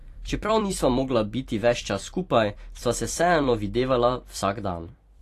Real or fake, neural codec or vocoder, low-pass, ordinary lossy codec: fake; vocoder, 44.1 kHz, 128 mel bands every 256 samples, BigVGAN v2; 14.4 kHz; AAC, 48 kbps